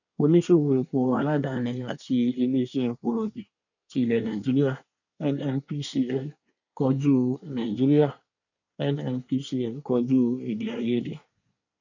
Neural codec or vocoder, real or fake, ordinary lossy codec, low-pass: codec, 24 kHz, 1 kbps, SNAC; fake; none; 7.2 kHz